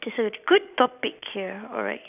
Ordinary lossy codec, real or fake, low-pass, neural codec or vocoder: none; fake; 3.6 kHz; vocoder, 44.1 kHz, 128 mel bands every 256 samples, BigVGAN v2